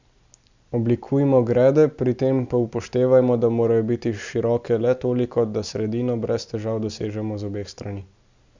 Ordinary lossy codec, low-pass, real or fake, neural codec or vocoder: Opus, 64 kbps; 7.2 kHz; real; none